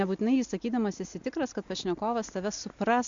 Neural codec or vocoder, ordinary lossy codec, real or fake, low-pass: none; MP3, 64 kbps; real; 7.2 kHz